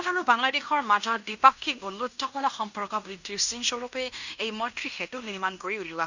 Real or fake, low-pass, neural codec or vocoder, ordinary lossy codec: fake; 7.2 kHz; codec, 16 kHz in and 24 kHz out, 0.9 kbps, LongCat-Audio-Codec, fine tuned four codebook decoder; none